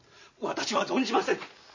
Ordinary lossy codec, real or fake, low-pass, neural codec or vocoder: MP3, 32 kbps; real; 7.2 kHz; none